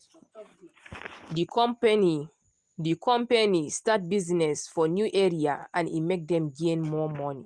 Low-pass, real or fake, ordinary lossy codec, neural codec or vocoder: 10.8 kHz; real; Opus, 24 kbps; none